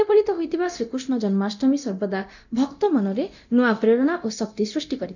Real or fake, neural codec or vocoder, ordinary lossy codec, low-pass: fake; codec, 24 kHz, 0.9 kbps, DualCodec; none; 7.2 kHz